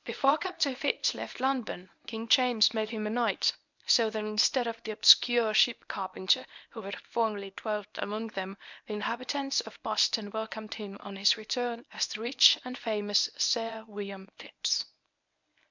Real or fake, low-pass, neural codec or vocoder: fake; 7.2 kHz; codec, 24 kHz, 0.9 kbps, WavTokenizer, medium speech release version 1